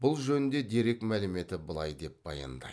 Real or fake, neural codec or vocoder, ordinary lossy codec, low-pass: real; none; none; none